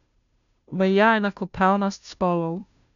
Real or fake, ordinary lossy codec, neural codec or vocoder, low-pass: fake; none; codec, 16 kHz, 0.5 kbps, FunCodec, trained on Chinese and English, 25 frames a second; 7.2 kHz